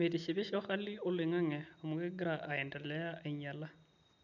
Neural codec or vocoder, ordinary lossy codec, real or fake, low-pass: none; none; real; 7.2 kHz